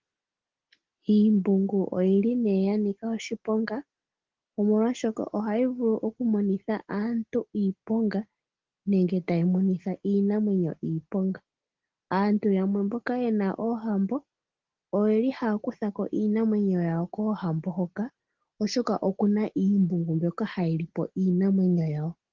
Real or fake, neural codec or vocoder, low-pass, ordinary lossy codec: real; none; 7.2 kHz; Opus, 16 kbps